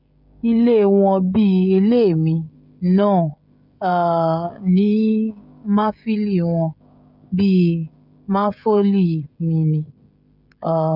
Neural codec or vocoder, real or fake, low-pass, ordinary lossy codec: codec, 16 kHz, 16 kbps, FreqCodec, smaller model; fake; 5.4 kHz; none